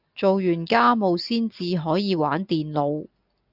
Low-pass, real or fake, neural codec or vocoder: 5.4 kHz; real; none